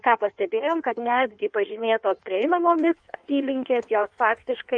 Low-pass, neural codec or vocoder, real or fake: 9.9 kHz; codec, 16 kHz in and 24 kHz out, 1.1 kbps, FireRedTTS-2 codec; fake